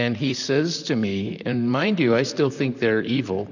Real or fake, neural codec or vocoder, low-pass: fake; vocoder, 44.1 kHz, 128 mel bands, Pupu-Vocoder; 7.2 kHz